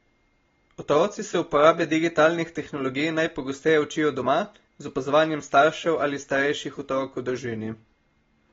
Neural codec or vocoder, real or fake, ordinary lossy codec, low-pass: none; real; AAC, 24 kbps; 7.2 kHz